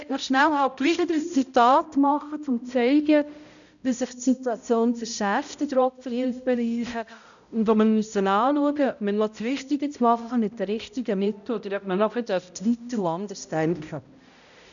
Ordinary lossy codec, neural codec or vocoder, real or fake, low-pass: none; codec, 16 kHz, 0.5 kbps, X-Codec, HuBERT features, trained on balanced general audio; fake; 7.2 kHz